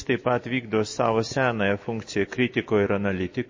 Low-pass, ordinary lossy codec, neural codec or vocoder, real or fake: 7.2 kHz; MP3, 32 kbps; none; real